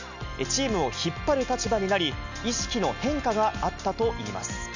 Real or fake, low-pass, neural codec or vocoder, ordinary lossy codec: real; 7.2 kHz; none; none